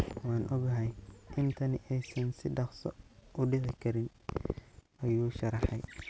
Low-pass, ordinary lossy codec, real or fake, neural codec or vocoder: none; none; real; none